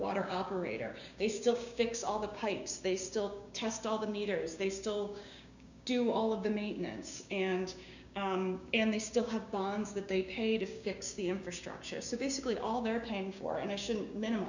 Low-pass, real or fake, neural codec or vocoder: 7.2 kHz; fake; codec, 16 kHz, 6 kbps, DAC